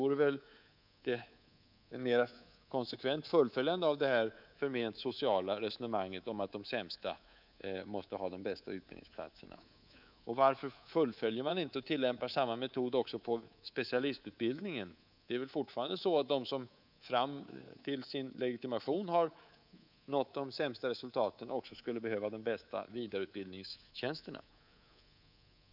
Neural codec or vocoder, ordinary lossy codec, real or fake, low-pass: codec, 24 kHz, 3.1 kbps, DualCodec; none; fake; 5.4 kHz